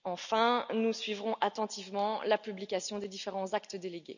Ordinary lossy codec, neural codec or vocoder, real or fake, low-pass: none; none; real; 7.2 kHz